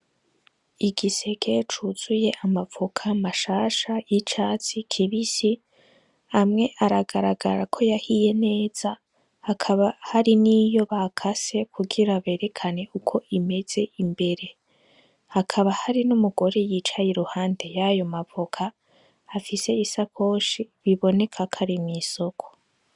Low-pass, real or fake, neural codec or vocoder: 10.8 kHz; real; none